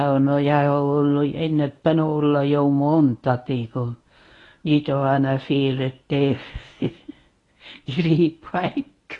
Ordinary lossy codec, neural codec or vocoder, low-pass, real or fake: AAC, 32 kbps; codec, 24 kHz, 0.9 kbps, WavTokenizer, medium speech release version 1; 10.8 kHz; fake